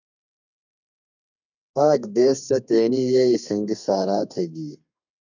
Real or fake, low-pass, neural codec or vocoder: fake; 7.2 kHz; codec, 32 kHz, 1.9 kbps, SNAC